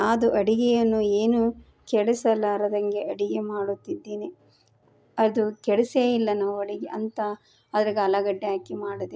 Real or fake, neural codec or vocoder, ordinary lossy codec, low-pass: real; none; none; none